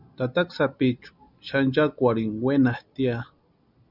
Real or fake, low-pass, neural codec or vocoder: real; 5.4 kHz; none